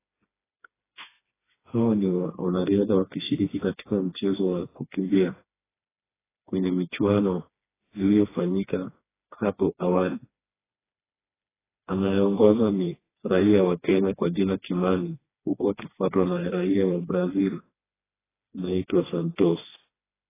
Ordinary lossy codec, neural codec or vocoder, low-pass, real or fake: AAC, 16 kbps; codec, 16 kHz, 2 kbps, FreqCodec, smaller model; 3.6 kHz; fake